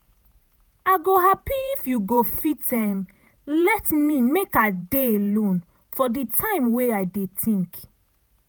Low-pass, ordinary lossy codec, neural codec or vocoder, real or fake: none; none; vocoder, 48 kHz, 128 mel bands, Vocos; fake